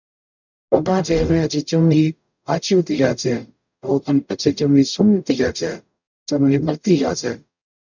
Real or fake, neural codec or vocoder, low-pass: fake; codec, 44.1 kHz, 0.9 kbps, DAC; 7.2 kHz